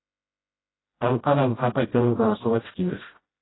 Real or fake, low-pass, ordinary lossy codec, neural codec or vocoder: fake; 7.2 kHz; AAC, 16 kbps; codec, 16 kHz, 0.5 kbps, FreqCodec, smaller model